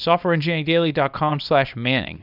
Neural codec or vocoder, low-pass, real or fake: codec, 24 kHz, 0.9 kbps, WavTokenizer, small release; 5.4 kHz; fake